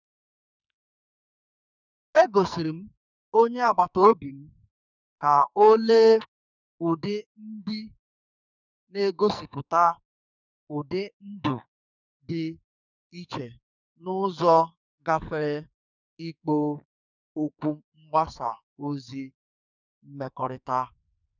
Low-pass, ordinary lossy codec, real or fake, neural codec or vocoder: 7.2 kHz; none; fake; codec, 44.1 kHz, 2.6 kbps, SNAC